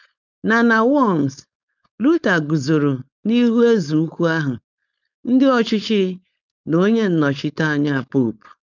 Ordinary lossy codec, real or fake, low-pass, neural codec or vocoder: none; fake; 7.2 kHz; codec, 16 kHz, 4.8 kbps, FACodec